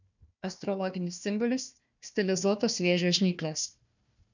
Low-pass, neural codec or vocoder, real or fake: 7.2 kHz; codec, 16 kHz, 1 kbps, FunCodec, trained on Chinese and English, 50 frames a second; fake